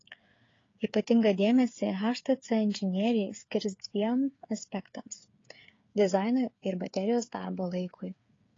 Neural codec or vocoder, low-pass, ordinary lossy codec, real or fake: codec, 16 kHz, 8 kbps, FreqCodec, smaller model; 7.2 kHz; AAC, 32 kbps; fake